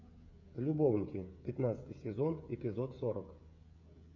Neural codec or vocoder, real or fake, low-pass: codec, 16 kHz, 16 kbps, FreqCodec, smaller model; fake; 7.2 kHz